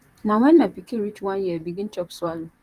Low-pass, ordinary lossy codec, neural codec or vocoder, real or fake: 14.4 kHz; Opus, 32 kbps; vocoder, 44.1 kHz, 128 mel bands, Pupu-Vocoder; fake